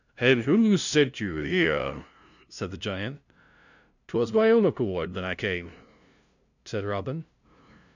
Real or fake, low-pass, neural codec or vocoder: fake; 7.2 kHz; codec, 16 kHz, 0.5 kbps, FunCodec, trained on LibriTTS, 25 frames a second